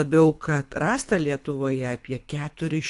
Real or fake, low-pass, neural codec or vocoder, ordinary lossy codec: fake; 10.8 kHz; codec, 24 kHz, 3 kbps, HILCodec; AAC, 64 kbps